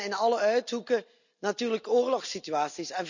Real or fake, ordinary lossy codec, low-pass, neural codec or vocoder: real; none; 7.2 kHz; none